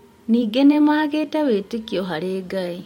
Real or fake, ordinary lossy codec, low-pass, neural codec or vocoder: fake; MP3, 64 kbps; 19.8 kHz; vocoder, 44.1 kHz, 128 mel bands every 512 samples, BigVGAN v2